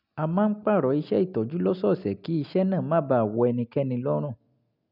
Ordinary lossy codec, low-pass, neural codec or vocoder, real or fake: none; 5.4 kHz; none; real